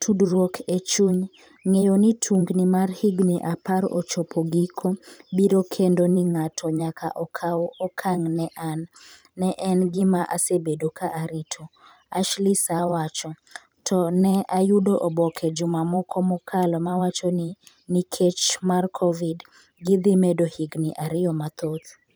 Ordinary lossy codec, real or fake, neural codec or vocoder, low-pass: none; fake; vocoder, 44.1 kHz, 128 mel bands every 512 samples, BigVGAN v2; none